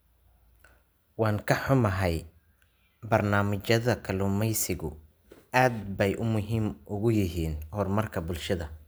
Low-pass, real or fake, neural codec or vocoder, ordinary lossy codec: none; real; none; none